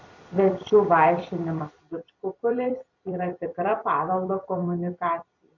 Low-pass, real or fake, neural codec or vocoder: 7.2 kHz; fake; vocoder, 44.1 kHz, 128 mel bands every 256 samples, BigVGAN v2